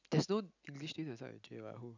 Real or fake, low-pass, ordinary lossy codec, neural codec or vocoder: real; 7.2 kHz; none; none